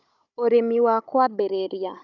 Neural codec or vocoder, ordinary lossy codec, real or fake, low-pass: codec, 16 kHz, 16 kbps, FunCodec, trained on Chinese and English, 50 frames a second; none; fake; 7.2 kHz